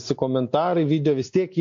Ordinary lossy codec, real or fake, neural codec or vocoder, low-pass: MP3, 48 kbps; real; none; 7.2 kHz